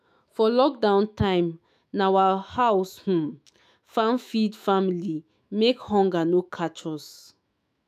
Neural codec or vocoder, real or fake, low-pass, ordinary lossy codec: autoencoder, 48 kHz, 128 numbers a frame, DAC-VAE, trained on Japanese speech; fake; 14.4 kHz; none